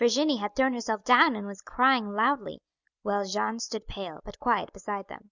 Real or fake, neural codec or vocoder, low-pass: real; none; 7.2 kHz